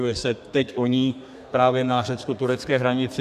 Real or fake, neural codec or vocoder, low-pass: fake; codec, 32 kHz, 1.9 kbps, SNAC; 14.4 kHz